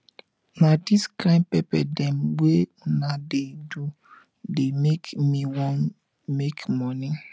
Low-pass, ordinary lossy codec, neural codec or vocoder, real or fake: none; none; none; real